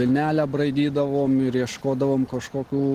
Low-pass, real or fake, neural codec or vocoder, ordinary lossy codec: 14.4 kHz; real; none; Opus, 16 kbps